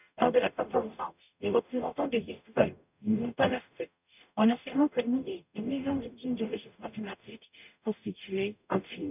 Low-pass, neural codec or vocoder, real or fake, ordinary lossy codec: 3.6 kHz; codec, 44.1 kHz, 0.9 kbps, DAC; fake; none